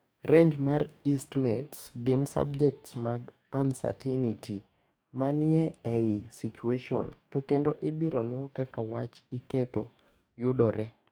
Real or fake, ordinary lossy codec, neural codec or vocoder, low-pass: fake; none; codec, 44.1 kHz, 2.6 kbps, DAC; none